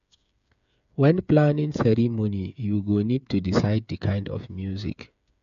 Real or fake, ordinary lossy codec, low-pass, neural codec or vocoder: fake; none; 7.2 kHz; codec, 16 kHz, 8 kbps, FreqCodec, smaller model